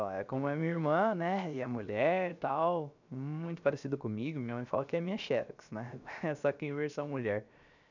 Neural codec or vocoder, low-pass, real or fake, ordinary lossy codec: codec, 16 kHz, 0.7 kbps, FocalCodec; 7.2 kHz; fake; none